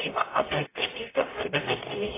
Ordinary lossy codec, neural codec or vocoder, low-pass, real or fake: AAC, 16 kbps; codec, 44.1 kHz, 0.9 kbps, DAC; 3.6 kHz; fake